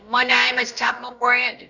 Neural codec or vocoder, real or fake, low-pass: codec, 16 kHz, about 1 kbps, DyCAST, with the encoder's durations; fake; 7.2 kHz